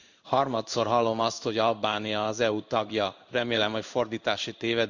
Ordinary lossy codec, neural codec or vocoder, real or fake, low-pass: none; codec, 16 kHz in and 24 kHz out, 1 kbps, XY-Tokenizer; fake; 7.2 kHz